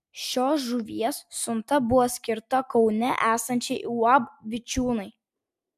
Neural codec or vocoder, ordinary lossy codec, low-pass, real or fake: none; MP3, 96 kbps; 14.4 kHz; real